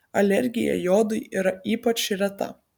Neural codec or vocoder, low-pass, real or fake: none; 19.8 kHz; real